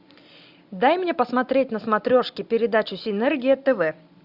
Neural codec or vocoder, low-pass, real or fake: none; 5.4 kHz; real